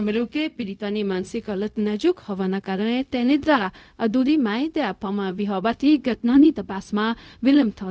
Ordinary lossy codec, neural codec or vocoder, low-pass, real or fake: none; codec, 16 kHz, 0.4 kbps, LongCat-Audio-Codec; none; fake